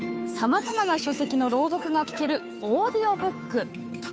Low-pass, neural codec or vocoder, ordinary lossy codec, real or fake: none; codec, 16 kHz, 2 kbps, FunCodec, trained on Chinese and English, 25 frames a second; none; fake